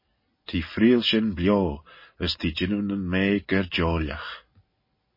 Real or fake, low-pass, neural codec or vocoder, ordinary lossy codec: real; 5.4 kHz; none; MP3, 24 kbps